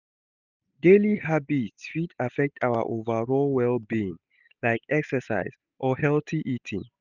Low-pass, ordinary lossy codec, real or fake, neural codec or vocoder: 7.2 kHz; none; real; none